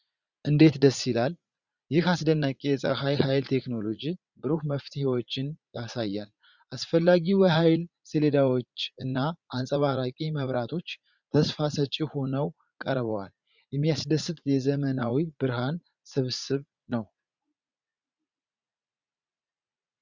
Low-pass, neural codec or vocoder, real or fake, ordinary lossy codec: 7.2 kHz; vocoder, 44.1 kHz, 80 mel bands, Vocos; fake; Opus, 64 kbps